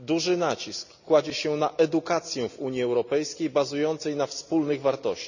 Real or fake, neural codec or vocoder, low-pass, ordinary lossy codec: real; none; 7.2 kHz; none